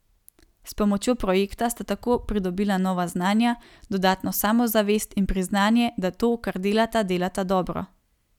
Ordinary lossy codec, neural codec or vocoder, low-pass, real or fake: none; none; 19.8 kHz; real